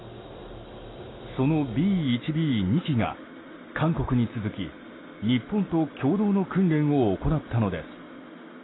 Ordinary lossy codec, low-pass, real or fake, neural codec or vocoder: AAC, 16 kbps; 7.2 kHz; real; none